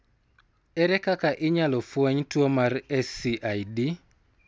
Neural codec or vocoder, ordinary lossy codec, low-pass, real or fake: none; none; none; real